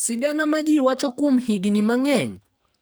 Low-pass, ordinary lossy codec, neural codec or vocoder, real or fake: none; none; codec, 44.1 kHz, 2.6 kbps, SNAC; fake